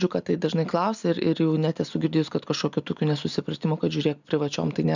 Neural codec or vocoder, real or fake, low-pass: none; real; 7.2 kHz